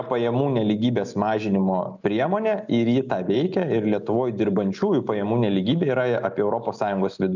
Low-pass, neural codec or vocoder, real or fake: 7.2 kHz; none; real